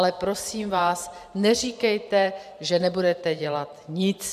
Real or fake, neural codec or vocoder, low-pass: fake; vocoder, 44.1 kHz, 128 mel bands every 512 samples, BigVGAN v2; 14.4 kHz